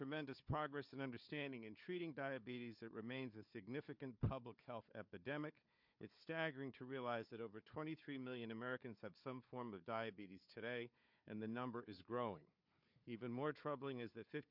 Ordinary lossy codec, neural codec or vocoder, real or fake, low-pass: MP3, 48 kbps; codec, 16 kHz, 4 kbps, FunCodec, trained on Chinese and English, 50 frames a second; fake; 5.4 kHz